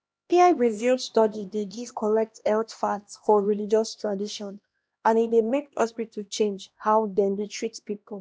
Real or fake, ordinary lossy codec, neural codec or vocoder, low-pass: fake; none; codec, 16 kHz, 1 kbps, X-Codec, HuBERT features, trained on LibriSpeech; none